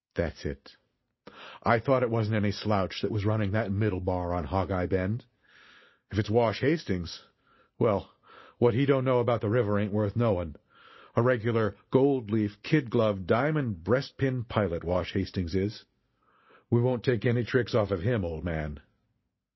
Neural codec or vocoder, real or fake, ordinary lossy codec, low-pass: none; real; MP3, 24 kbps; 7.2 kHz